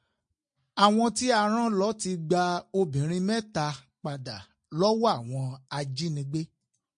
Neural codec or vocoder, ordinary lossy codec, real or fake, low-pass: none; MP3, 48 kbps; real; 9.9 kHz